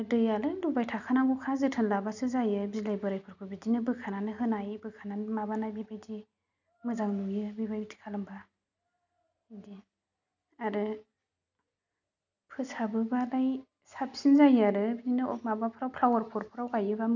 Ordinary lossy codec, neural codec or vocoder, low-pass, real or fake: none; none; 7.2 kHz; real